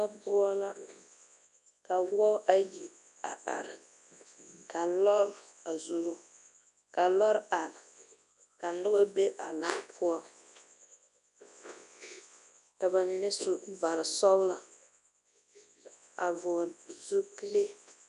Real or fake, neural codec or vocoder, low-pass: fake; codec, 24 kHz, 0.9 kbps, WavTokenizer, large speech release; 10.8 kHz